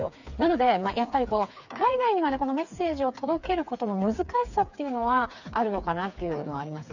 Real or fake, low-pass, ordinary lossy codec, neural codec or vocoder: fake; 7.2 kHz; none; codec, 16 kHz, 4 kbps, FreqCodec, smaller model